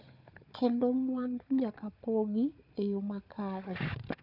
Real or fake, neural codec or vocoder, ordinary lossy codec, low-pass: fake; codec, 16 kHz, 4 kbps, FunCodec, trained on Chinese and English, 50 frames a second; none; 5.4 kHz